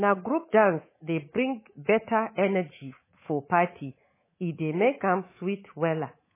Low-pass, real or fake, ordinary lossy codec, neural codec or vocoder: 3.6 kHz; fake; MP3, 16 kbps; codec, 24 kHz, 3.1 kbps, DualCodec